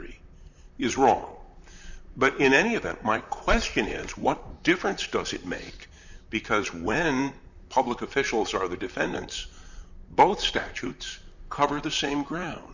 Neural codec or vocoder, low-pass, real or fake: vocoder, 44.1 kHz, 128 mel bands, Pupu-Vocoder; 7.2 kHz; fake